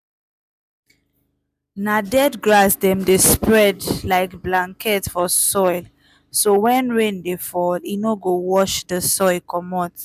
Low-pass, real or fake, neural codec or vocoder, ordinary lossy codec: 14.4 kHz; real; none; none